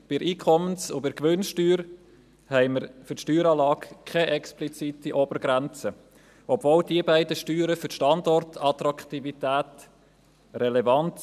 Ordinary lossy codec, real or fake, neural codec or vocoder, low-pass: none; real; none; 14.4 kHz